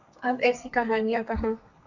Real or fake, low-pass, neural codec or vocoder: fake; 7.2 kHz; codec, 32 kHz, 1.9 kbps, SNAC